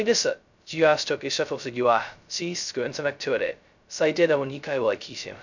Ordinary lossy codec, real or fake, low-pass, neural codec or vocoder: none; fake; 7.2 kHz; codec, 16 kHz, 0.2 kbps, FocalCodec